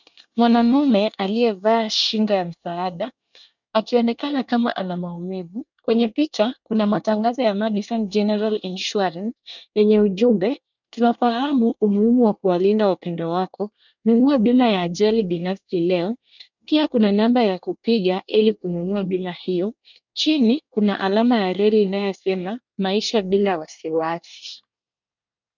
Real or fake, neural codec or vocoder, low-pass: fake; codec, 24 kHz, 1 kbps, SNAC; 7.2 kHz